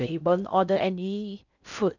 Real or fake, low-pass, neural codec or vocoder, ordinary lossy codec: fake; 7.2 kHz; codec, 16 kHz in and 24 kHz out, 0.6 kbps, FocalCodec, streaming, 4096 codes; none